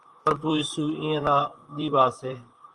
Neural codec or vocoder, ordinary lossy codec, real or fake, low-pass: vocoder, 44.1 kHz, 128 mel bands, Pupu-Vocoder; Opus, 32 kbps; fake; 10.8 kHz